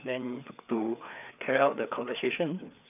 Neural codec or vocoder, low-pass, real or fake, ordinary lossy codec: codec, 16 kHz, 4 kbps, FreqCodec, larger model; 3.6 kHz; fake; none